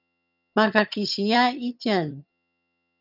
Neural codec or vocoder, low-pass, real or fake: vocoder, 22.05 kHz, 80 mel bands, HiFi-GAN; 5.4 kHz; fake